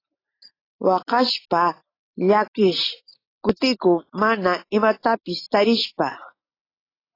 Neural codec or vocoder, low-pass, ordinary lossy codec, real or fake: none; 5.4 kHz; AAC, 24 kbps; real